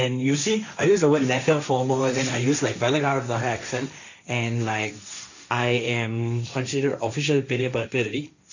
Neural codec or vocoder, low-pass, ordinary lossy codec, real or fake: codec, 16 kHz, 1.1 kbps, Voila-Tokenizer; 7.2 kHz; none; fake